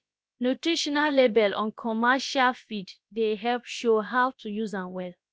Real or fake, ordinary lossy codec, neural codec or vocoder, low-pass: fake; none; codec, 16 kHz, about 1 kbps, DyCAST, with the encoder's durations; none